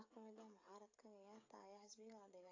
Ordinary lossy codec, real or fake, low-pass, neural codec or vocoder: AAC, 32 kbps; fake; 7.2 kHz; codec, 16 kHz, 16 kbps, FreqCodec, smaller model